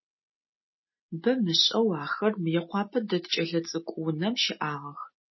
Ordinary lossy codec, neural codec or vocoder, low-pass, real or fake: MP3, 24 kbps; none; 7.2 kHz; real